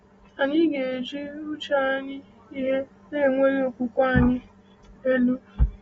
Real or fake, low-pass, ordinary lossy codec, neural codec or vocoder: real; 19.8 kHz; AAC, 24 kbps; none